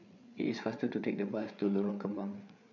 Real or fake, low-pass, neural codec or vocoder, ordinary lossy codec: fake; 7.2 kHz; codec, 16 kHz, 8 kbps, FreqCodec, larger model; none